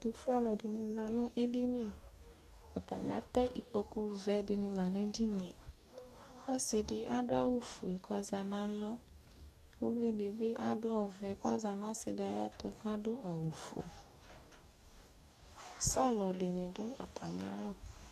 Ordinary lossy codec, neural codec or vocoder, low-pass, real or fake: AAC, 96 kbps; codec, 44.1 kHz, 2.6 kbps, DAC; 14.4 kHz; fake